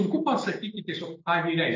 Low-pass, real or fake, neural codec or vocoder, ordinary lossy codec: 7.2 kHz; real; none; AAC, 32 kbps